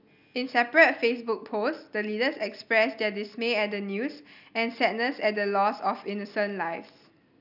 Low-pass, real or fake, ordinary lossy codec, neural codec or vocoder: 5.4 kHz; real; none; none